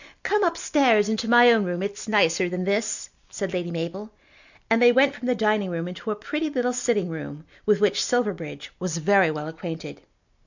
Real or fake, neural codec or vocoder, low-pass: real; none; 7.2 kHz